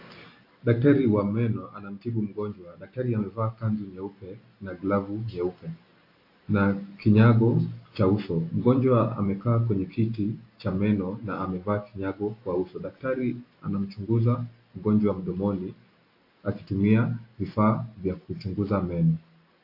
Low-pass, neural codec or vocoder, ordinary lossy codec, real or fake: 5.4 kHz; none; AAC, 32 kbps; real